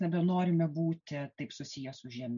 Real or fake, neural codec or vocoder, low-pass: real; none; 7.2 kHz